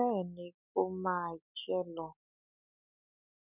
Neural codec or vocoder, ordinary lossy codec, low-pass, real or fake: none; none; 3.6 kHz; real